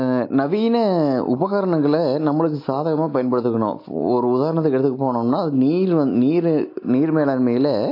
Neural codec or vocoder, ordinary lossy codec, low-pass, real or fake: none; AAC, 32 kbps; 5.4 kHz; real